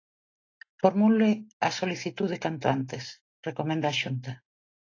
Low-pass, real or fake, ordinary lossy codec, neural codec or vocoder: 7.2 kHz; real; AAC, 32 kbps; none